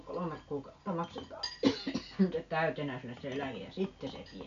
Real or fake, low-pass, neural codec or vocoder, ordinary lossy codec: real; 7.2 kHz; none; MP3, 64 kbps